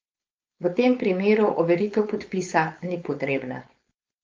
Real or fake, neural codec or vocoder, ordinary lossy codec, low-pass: fake; codec, 16 kHz, 4.8 kbps, FACodec; Opus, 24 kbps; 7.2 kHz